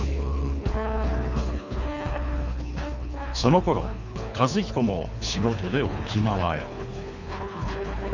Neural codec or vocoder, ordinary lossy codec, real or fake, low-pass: codec, 24 kHz, 3 kbps, HILCodec; none; fake; 7.2 kHz